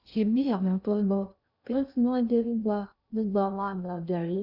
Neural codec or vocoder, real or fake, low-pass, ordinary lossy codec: codec, 16 kHz in and 24 kHz out, 0.6 kbps, FocalCodec, streaming, 2048 codes; fake; 5.4 kHz; none